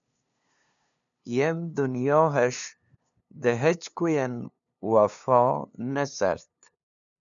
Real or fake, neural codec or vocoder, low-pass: fake; codec, 16 kHz, 2 kbps, FunCodec, trained on LibriTTS, 25 frames a second; 7.2 kHz